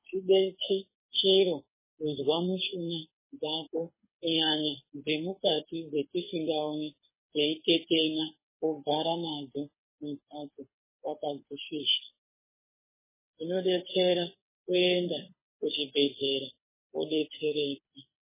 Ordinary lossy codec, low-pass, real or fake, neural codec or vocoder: MP3, 16 kbps; 3.6 kHz; fake; codec, 24 kHz, 6 kbps, HILCodec